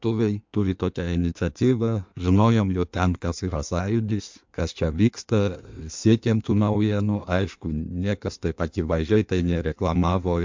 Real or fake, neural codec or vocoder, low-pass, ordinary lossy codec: fake; codec, 16 kHz in and 24 kHz out, 1.1 kbps, FireRedTTS-2 codec; 7.2 kHz; MP3, 64 kbps